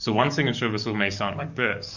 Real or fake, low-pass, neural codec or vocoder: fake; 7.2 kHz; codec, 44.1 kHz, 7.8 kbps, DAC